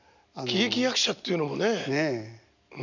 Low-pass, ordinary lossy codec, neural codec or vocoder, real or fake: 7.2 kHz; none; none; real